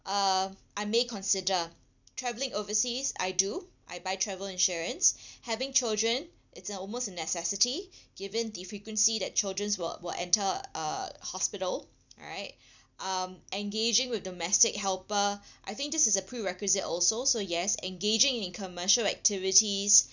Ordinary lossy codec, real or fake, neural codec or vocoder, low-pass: none; real; none; 7.2 kHz